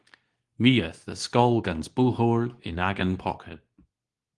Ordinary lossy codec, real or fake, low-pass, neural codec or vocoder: Opus, 24 kbps; fake; 10.8 kHz; codec, 24 kHz, 0.9 kbps, WavTokenizer, medium speech release version 2